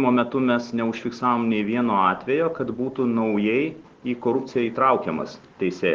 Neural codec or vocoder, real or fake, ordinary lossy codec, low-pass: none; real; Opus, 16 kbps; 7.2 kHz